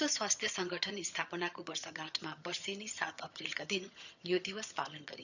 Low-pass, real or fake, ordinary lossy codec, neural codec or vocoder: 7.2 kHz; fake; none; vocoder, 22.05 kHz, 80 mel bands, HiFi-GAN